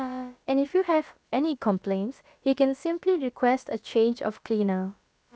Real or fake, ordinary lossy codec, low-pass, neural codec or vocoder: fake; none; none; codec, 16 kHz, about 1 kbps, DyCAST, with the encoder's durations